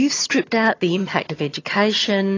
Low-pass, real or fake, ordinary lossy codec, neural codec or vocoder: 7.2 kHz; fake; AAC, 32 kbps; vocoder, 22.05 kHz, 80 mel bands, HiFi-GAN